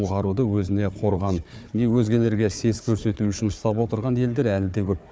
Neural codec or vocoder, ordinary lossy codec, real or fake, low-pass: codec, 16 kHz, 4 kbps, FunCodec, trained on Chinese and English, 50 frames a second; none; fake; none